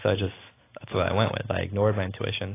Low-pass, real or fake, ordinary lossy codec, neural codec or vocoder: 3.6 kHz; real; AAC, 16 kbps; none